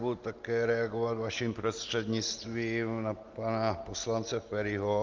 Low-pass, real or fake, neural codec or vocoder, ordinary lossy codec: 7.2 kHz; real; none; Opus, 32 kbps